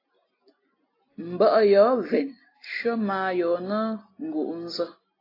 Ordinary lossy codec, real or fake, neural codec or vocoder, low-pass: AAC, 24 kbps; real; none; 5.4 kHz